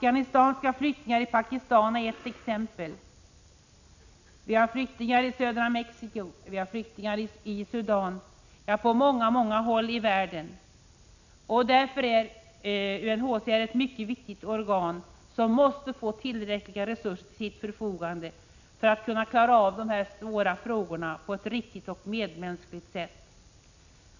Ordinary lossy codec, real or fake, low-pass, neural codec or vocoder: none; real; 7.2 kHz; none